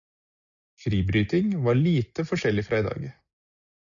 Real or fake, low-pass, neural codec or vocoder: real; 7.2 kHz; none